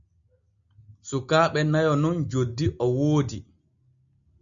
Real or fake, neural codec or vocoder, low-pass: real; none; 7.2 kHz